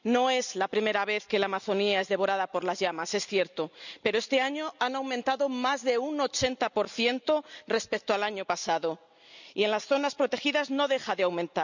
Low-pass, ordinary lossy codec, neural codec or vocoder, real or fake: 7.2 kHz; none; none; real